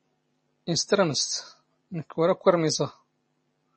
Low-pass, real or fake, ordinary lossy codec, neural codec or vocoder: 10.8 kHz; fake; MP3, 32 kbps; vocoder, 44.1 kHz, 128 mel bands every 256 samples, BigVGAN v2